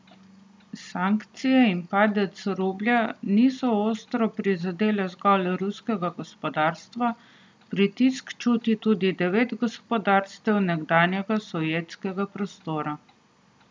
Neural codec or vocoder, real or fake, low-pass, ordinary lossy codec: none; real; none; none